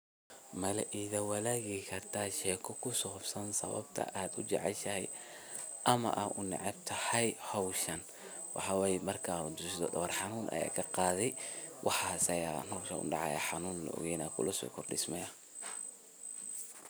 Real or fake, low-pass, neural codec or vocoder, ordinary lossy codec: real; none; none; none